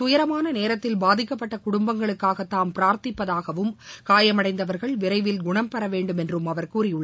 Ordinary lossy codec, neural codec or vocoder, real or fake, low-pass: none; none; real; none